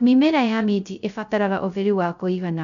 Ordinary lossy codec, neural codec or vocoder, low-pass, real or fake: none; codec, 16 kHz, 0.2 kbps, FocalCodec; 7.2 kHz; fake